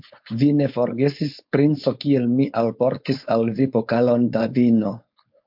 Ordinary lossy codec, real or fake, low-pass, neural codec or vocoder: MP3, 48 kbps; fake; 5.4 kHz; codec, 16 kHz, 4.8 kbps, FACodec